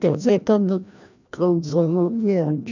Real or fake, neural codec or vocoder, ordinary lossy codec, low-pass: fake; codec, 16 kHz, 1 kbps, FreqCodec, larger model; none; 7.2 kHz